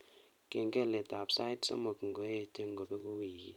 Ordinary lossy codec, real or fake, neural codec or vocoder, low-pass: none; fake; vocoder, 44.1 kHz, 128 mel bands every 512 samples, BigVGAN v2; 19.8 kHz